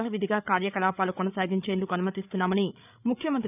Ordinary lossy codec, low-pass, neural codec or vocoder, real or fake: none; 3.6 kHz; codec, 16 kHz, 8 kbps, FreqCodec, larger model; fake